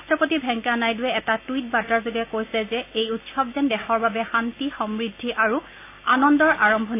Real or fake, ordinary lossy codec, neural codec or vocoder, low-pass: real; AAC, 24 kbps; none; 3.6 kHz